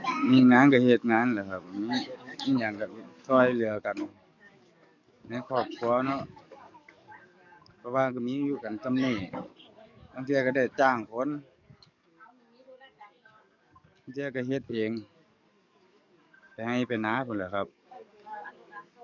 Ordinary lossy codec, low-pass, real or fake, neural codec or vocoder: none; 7.2 kHz; fake; codec, 44.1 kHz, 7.8 kbps, DAC